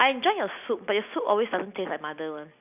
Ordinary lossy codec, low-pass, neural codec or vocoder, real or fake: none; 3.6 kHz; none; real